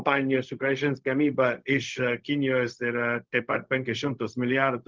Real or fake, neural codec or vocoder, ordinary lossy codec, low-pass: fake; codec, 16 kHz, 0.4 kbps, LongCat-Audio-Codec; Opus, 32 kbps; 7.2 kHz